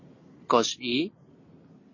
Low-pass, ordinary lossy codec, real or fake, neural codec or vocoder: 7.2 kHz; MP3, 32 kbps; real; none